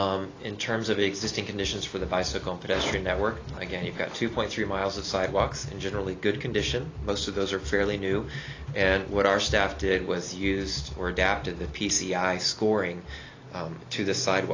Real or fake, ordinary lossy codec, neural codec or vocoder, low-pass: real; AAC, 32 kbps; none; 7.2 kHz